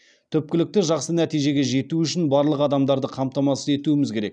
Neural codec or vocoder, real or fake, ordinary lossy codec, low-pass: none; real; none; 9.9 kHz